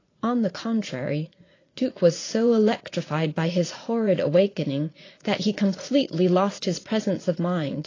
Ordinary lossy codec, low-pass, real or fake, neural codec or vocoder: AAC, 32 kbps; 7.2 kHz; fake; vocoder, 44.1 kHz, 80 mel bands, Vocos